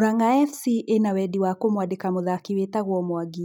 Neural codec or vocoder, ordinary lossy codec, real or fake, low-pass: none; none; real; 19.8 kHz